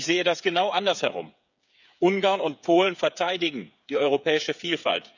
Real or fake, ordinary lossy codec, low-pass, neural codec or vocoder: fake; none; 7.2 kHz; codec, 16 kHz, 8 kbps, FreqCodec, smaller model